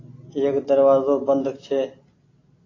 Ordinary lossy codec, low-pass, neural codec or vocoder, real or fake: AAC, 32 kbps; 7.2 kHz; none; real